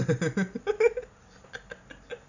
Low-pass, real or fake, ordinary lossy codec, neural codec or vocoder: 7.2 kHz; real; none; none